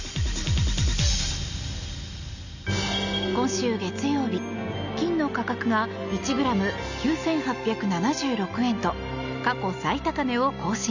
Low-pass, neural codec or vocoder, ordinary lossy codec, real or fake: 7.2 kHz; none; none; real